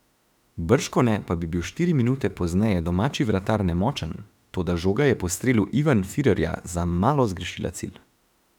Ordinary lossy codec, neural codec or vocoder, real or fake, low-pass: none; autoencoder, 48 kHz, 32 numbers a frame, DAC-VAE, trained on Japanese speech; fake; 19.8 kHz